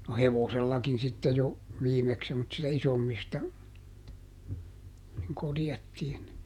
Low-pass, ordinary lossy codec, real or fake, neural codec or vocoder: 19.8 kHz; none; fake; vocoder, 48 kHz, 128 mel bands, Vocos